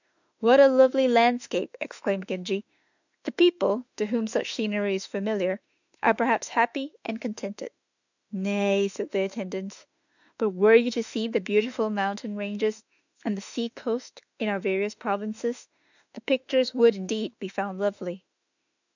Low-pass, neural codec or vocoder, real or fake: 7.2 kHz; autoencoder, 48 kHz, 32 numbers a frame, DAC-VAE, trained on Japanese speech; fake